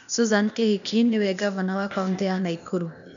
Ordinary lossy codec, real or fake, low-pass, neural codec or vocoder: none; fake; 7.2 kHz; codec, 16 kHz, 0.8 kbps, ZipCodec